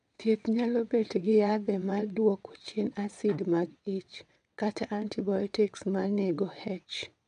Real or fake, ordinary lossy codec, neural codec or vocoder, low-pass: fake; MP3, 96 kbps; vocoder, 22.05 kHz, 80 mel bands, WaveNeXt; 9.9 kHz